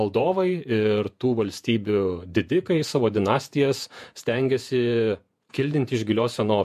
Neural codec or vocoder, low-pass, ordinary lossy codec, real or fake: vocoder, 48 kHz, 128 mel bands, Vocos; 14.4 kHz; MP3, 64 kbps; fake